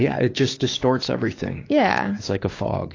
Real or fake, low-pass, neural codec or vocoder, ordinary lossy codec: fake; 7.2 kHz; codec, 16 kHz, 6 kbps, DAC; AAC, 32 kbps